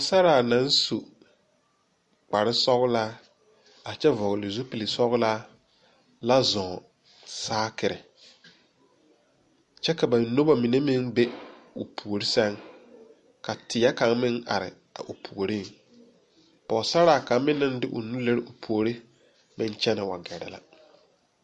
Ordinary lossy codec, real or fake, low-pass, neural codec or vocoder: MP3, 48 kbps; real; 14.4 kHz; none